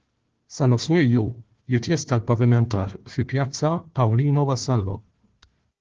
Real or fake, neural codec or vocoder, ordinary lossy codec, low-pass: fake; codec, 16 kHz, 1 kbps, FunCodec, trained on Chinese and English, 50 frames a second; Opus, 16 kbps; 7.2 kHz